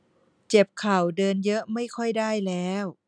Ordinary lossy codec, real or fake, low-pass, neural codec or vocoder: none; real; 9.9 kHz; none